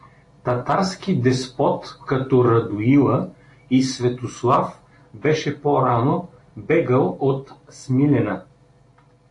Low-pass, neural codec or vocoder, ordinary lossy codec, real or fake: 10.8 kHz; none; AAC, 48 kbps; real